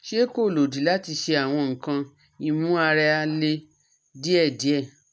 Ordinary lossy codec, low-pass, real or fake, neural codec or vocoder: none; none; real; none